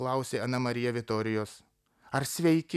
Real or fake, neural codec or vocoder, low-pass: real; none; 14.4 kHz